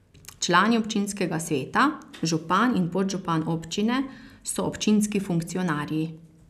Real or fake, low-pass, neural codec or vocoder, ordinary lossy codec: real; 14.4 kHz; none; none